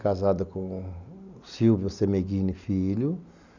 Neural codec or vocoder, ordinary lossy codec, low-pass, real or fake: none; none; 7.2 kHz; real